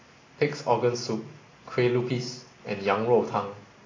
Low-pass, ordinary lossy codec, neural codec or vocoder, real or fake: 7.2 kHz; AAC, 32 kbps; none; real